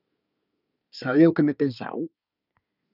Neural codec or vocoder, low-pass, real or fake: codec, 24 kHz, 1 kbps, SNAC; 5.4 kHz; fake